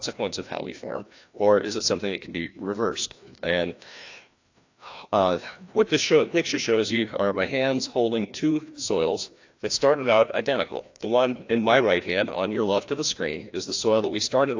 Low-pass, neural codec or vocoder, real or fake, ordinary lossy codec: 7.2 kHz; codec, 16 kHz, 1 kbps, FreqCodec, larger model; fake; AAC, 48 kbps